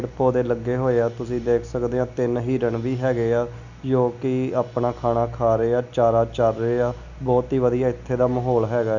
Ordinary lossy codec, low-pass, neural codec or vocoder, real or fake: none; 7.2 kHz; none; real